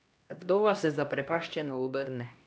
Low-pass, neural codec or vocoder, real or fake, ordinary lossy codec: none; codec, 16 kHz, 1 kbps, X-Codec, HuBERT features, trained on LibriSpeech; fake; none